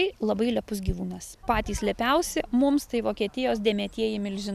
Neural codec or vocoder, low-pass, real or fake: none; 14.4 kHz; real